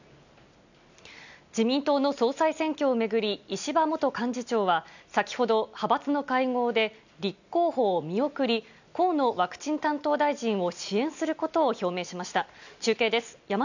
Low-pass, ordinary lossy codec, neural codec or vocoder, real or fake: 7.2 kHz; none; none; real